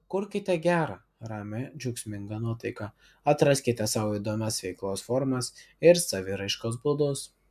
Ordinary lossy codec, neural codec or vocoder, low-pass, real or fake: MP3, 96 kbps; none; 14.4 kHz; real